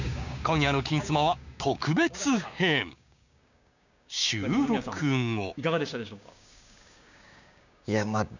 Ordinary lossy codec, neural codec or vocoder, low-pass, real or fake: none; codec, 16 kHz, 6 kbps, DAC; 7.2 kHz; fake